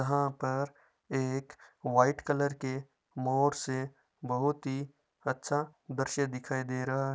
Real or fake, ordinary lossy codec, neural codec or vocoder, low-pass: real; none; none; none